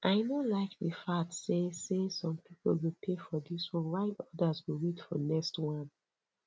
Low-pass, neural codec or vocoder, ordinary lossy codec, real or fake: none; none; none; real